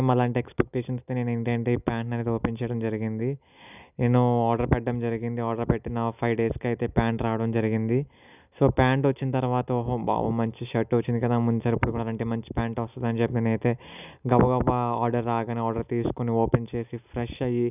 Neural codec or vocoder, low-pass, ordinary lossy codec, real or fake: none; 3.6 kHz; none; real